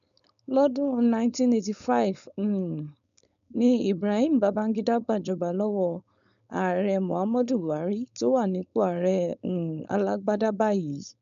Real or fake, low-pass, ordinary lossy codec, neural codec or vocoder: fake; 7.2 kHz; none; codec, 16 kHz, 4.8 kbps, FACodec